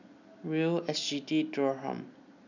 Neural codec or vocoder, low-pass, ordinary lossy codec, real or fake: none; 7.2 kHz; none; real